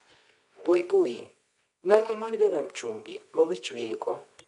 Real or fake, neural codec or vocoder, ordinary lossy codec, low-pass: fake; codec, 24 kHz, 0.9 kbps, WavTokenizer, medium music audio release; none; 10.8 kHz